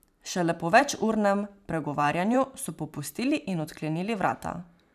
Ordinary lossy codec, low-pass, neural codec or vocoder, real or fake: none; 14.4 kHz; vocoder, 44.1 kHz, 128 mel bands every 512 samples, BigVGAN v2; fake